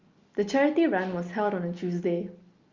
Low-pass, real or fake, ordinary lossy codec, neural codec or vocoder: 7.2 kHz; real; Opus, 32 kbps; none